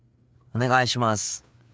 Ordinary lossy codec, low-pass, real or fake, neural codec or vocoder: none; none; fake; codec, 16 kHz, 4 kbps, FreqCodec, larger model